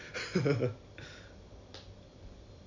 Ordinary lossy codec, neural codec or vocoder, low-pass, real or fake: MP3, 64 kbps; none; 7.2 kHz; real